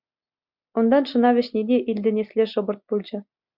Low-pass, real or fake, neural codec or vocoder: 5.4 kHz; real; none